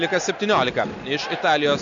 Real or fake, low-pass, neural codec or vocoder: real; 7.2 kHz; none